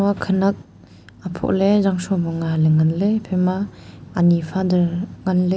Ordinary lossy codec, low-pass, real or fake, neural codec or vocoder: none; none; real; none